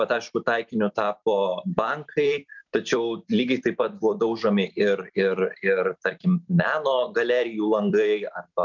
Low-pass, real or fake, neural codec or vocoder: 7.2 kHz; fake; vocoder, 44.1 kHz, 128 mel bands every 256 samples, BigVGAN v2